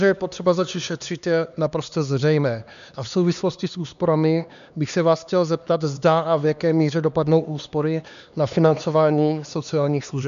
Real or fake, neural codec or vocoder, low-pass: fake; codec, 16 kHz, 2 kbps, X-Codec, HuBERT features, trained on LibriSpeech; 7.2 kHz